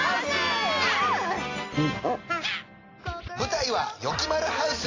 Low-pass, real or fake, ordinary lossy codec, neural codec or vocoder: 7.2 kHz; real; AAC, 32 kbps; none